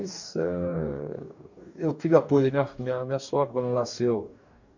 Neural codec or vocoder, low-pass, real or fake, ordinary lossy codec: codec, 44.1 kHz, 2.6 kbps, DAC; 7.2 kHz; fake; none